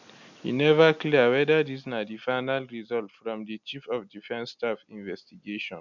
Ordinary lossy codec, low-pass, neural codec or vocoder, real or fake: none; 7.2 kHz; none; real